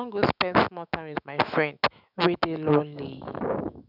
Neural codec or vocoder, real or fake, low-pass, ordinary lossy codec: autoencoder, 48 kHz, 128 numbers a frame, DAC-VAE, trained on Japanese speech; fake; 5.4 kHz; none